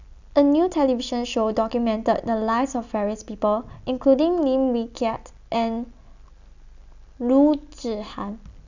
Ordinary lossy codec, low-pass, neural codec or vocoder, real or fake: none; 7.2 kHz; none; real